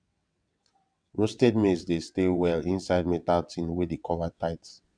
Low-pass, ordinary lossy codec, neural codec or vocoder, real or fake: 9.9 kHz; none; vocoder, 22.05 kHz, 80 mel bands, WaveNeXt; fake